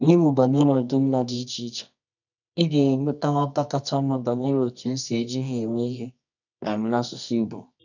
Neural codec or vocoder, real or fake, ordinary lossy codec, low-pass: codec, 24 kHz, 0.9 kbps, WavTokenizer, medium music audio release; fake; none; 7.2 kHz